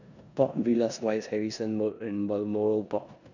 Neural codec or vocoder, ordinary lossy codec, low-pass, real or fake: codec, 16 kHz in and 24 kHz out, 0.9 kbps, LongCat-Audio-Codec, four codebook decoder; none; 7.2 kHz; fake